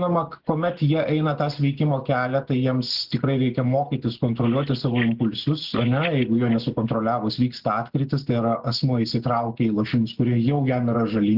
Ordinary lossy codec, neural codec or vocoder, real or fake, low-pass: Opus, 16 kbps; none; real; 5.4 kHz